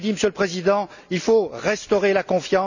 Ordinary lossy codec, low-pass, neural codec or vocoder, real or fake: none; 7.2 kHz; none; real